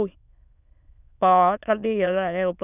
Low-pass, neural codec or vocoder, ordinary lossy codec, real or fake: 3.6 kHz; autoencoder, 22.05 kHz, a latent of 192 numbers a frame, VITS, trained on many speakers; none; fake